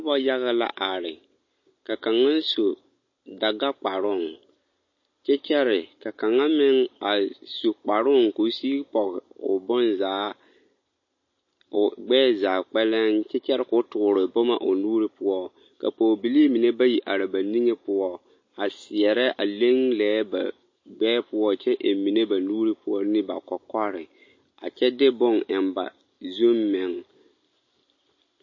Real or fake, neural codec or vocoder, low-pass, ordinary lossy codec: real; none; 7.2 kHz; MP3, 32 kbps